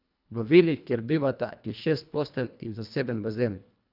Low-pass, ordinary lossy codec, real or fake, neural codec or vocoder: 5.4 kHz; none; fake; codec, 24 kHz, 1.5 kbps, HILCodec